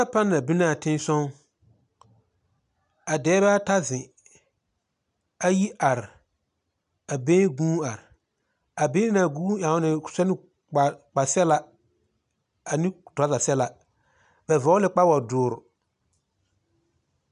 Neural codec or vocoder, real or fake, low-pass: none; real; 10.8 kHz